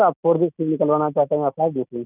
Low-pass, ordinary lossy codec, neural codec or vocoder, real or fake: 3.6 kHz; none; none; real